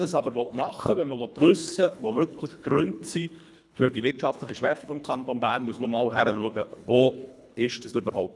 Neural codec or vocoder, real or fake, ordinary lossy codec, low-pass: codec, 24 kHz, 1.5 kbps, HILCodec; fake; none; none